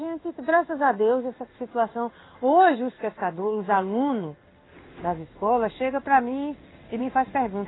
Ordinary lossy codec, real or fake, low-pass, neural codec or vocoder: AAC, 16 kbps; fake; 7.2 kHz; codec, 16 kHz in and 24 kHz out, 1 kbps, XY-Tokenizer